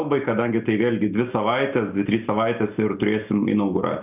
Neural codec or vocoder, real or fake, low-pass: none; real; 3.6 kHz